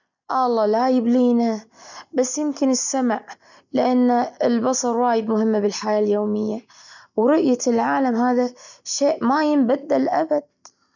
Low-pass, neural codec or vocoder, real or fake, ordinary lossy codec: 7.2 kHz; none; real; none